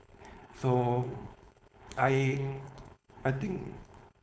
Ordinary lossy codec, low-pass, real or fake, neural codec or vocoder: none; none; fake; codec, 16 kHz, 4.8 kbps, FACodec